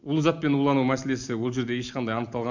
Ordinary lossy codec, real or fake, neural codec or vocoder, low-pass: none; real; none; 7.2 kHz